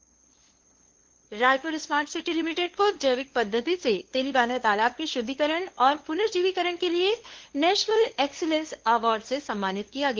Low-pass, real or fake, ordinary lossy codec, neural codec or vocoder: 7.2 kHz; fake; Opus, 16 kbps; codec, 16 kHz, 2 kbps, FunCodec, trained on LibriTTS, 25 frames a second